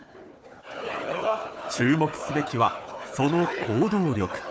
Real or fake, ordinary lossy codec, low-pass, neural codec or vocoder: fake; none; none; codec, 16 kHz, 4 kbps, FunCodec, trained on Chinese and English, 50 frames a second